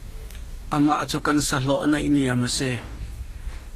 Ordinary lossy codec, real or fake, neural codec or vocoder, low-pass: AAC, 48 kbps; fake; codec, 44.1 kHz, 2.6 kbps, DAC; 14.4 kHz